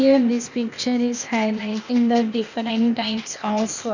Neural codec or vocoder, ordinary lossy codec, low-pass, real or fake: codec, 16 kHz, 0.8 kbps, ZipCodec; none; 7.2 kHz; fake